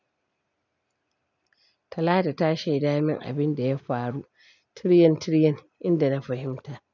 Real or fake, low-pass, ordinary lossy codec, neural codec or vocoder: real; 7.2 kHz; none; none